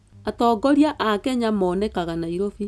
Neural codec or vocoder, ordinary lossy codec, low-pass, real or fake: none; none; none; real